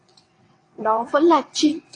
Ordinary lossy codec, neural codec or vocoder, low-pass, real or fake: AAC, 48 kbps; vocoder, 22.05 kHz, 80 mel bands, WaveNeXt; 9.9 kHz; fake